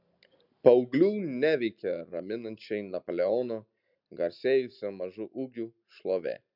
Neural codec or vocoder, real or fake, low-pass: none; real; 5.4 kHz